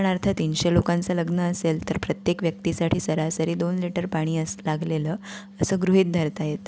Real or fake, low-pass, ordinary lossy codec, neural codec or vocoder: real; none; none; none